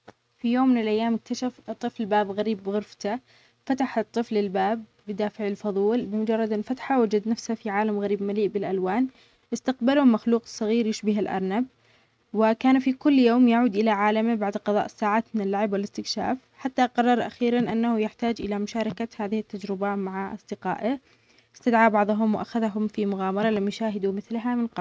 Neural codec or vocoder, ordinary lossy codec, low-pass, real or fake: none; none; none; real